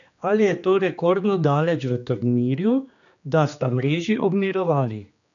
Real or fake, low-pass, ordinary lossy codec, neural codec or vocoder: fake; 7.2 kHz; none; codec, 16 kHz, 2 kbps, X-Codec, HuBERT features, trained on general audio